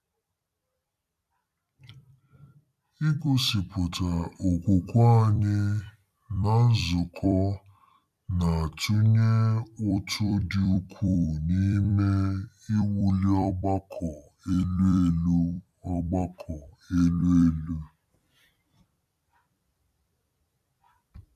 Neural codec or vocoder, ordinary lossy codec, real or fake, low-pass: vocoder, 44.1 kHz, 128 mel bands every 256 samples, BigVGAN v2; none; fake; 14.4 kHz